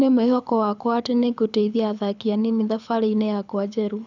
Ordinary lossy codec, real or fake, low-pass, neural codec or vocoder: none; fake; 7.2 kHz; codec, 24 kHz, 6 kbps, HILCodec